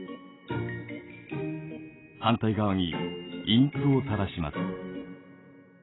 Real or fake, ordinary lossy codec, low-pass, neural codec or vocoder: real; AAC, 16 kbps; 7.2 kHz; none